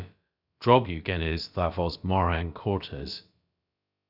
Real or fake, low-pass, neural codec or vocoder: fake; 5.4 kHz; codec, 16 kHz, about 1 kbps, DyCAST, with the encoder's durations